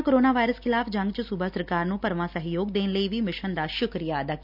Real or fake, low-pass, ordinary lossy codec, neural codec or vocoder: real; 5.4 kHz; none; none